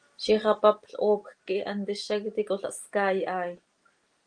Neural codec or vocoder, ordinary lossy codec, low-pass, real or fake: none; Opus, 24 kbps; 9.9 kHz; real